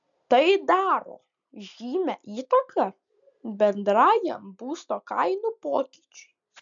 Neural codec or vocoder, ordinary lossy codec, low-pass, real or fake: none; MP3, 96 kbps; 7.2 kHz; real